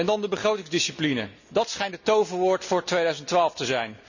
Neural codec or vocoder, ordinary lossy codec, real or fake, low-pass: none; none; real; 7.2 kHz